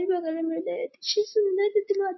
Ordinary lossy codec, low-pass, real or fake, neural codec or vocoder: MP3, 24 kbps; 7.2 kHz; real; none